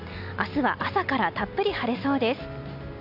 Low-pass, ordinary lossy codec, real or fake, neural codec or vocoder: 5.4 kHz; none; real; none